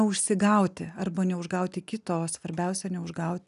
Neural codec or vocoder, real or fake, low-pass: none; real; 10.8 kHz